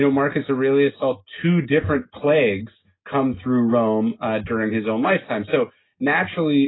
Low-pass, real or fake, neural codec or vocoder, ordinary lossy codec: 7.2 kHz; real; none; AAC, 16 kbps